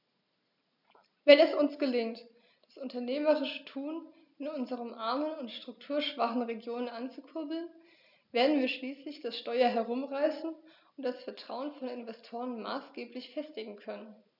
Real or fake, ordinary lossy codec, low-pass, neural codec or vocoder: real; none; 5.4 kHz; none